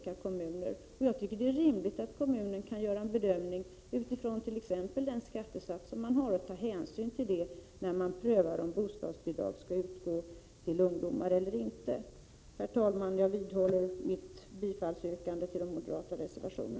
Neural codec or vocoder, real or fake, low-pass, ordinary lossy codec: none; real; none; none